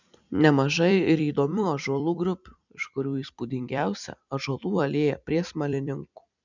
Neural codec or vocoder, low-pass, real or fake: vocoder, 44.1 kHz, 128 mel bands every 256 samples, BigVGAN v2; 7.2 kHz; fake